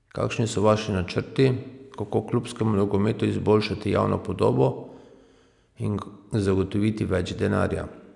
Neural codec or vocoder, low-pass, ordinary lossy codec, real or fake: none; 10.8 kHz; none; real